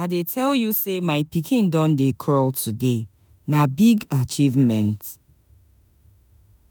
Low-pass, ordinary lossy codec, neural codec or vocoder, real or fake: none; none; autoencoder, 48 kHz, 32 numbers a frame, DAC-VAE, trained on Japanese speech; fake